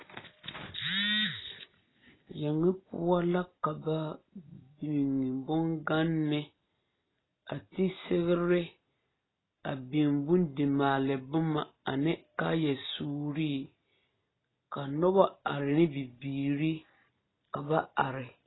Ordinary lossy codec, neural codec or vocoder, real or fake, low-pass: AAC, 16 kbps; none; real; 7.2 kHz